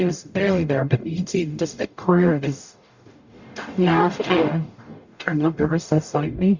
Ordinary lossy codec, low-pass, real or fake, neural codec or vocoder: Opus, 64 kbps; 7.2 kHz; fake; codec, 44.1 kHz, 0.9 kbps, DAC